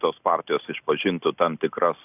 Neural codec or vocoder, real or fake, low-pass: none; real; 3.6 kHz